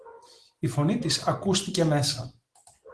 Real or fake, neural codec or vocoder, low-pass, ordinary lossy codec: real; none; 10.8 kHz; Opus, 16 kbps